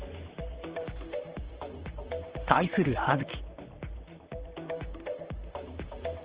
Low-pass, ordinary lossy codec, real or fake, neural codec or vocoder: 3.6 kHz; Opus, 16 kbps; fake; vocoder, 44.1 kHz, 128 mel bands, Pupu-Vocoder